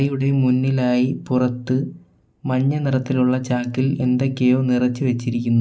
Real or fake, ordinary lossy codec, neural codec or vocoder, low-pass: real; none; none; none